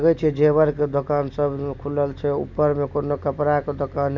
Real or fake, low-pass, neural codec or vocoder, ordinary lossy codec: real; 7.2 kHz; none; none